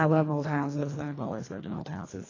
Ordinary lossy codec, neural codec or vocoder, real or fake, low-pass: AAC, 32 kbps; codec, 24 kHz, 1.5 kbps, HILCodec; fake; 7.2 kHz